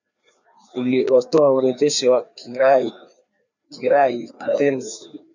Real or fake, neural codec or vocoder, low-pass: fake; codec, 16 kHz, 2 kbps, FreqCodec, larger model; 7.2 kHz